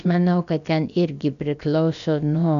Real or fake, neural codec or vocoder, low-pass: fake; codec, 16 kHz, about 1 kbps, DyCAST, with the encoder's durations; 7.2 kHz